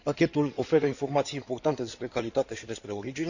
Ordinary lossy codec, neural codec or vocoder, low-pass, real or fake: none; codec, 16 kHz in and 24 kHz out, 2.2 kbps, FireRedTTS-2 codec; 7.2 kHz; fake